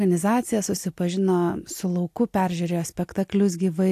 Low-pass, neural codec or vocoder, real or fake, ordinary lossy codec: 14.4 kHz; none; real; AAC, 64 kbps